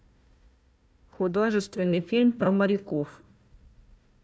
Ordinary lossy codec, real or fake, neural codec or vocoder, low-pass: none; fake; codec, 16 kHz, 1 kbps, FunCodec, trained on Chinese and English, 50 frames a second; none